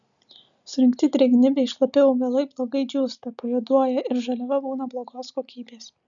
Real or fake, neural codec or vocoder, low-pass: real; none; 7.2 kHz